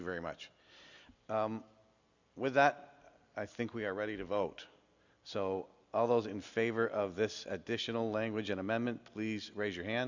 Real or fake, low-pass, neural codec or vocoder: real; 7.2 kHz; none